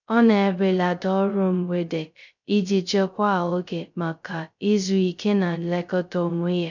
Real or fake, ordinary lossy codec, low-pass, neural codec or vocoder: fake; none; 7.2 kHz; codec, 16 kHz, 0.2 kbps, FocalCodec